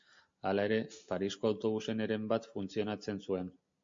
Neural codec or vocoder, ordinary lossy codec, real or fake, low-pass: none; MP3, 48 kbps; real; 7.2 kHz